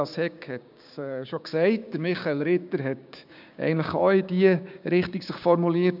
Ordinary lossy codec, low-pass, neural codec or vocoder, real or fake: none; 5.4 kHz; codec, 16 kHz, 6 kbps, DAC; fake